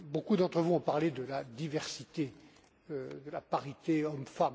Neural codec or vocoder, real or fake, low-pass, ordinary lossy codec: none; real; none; none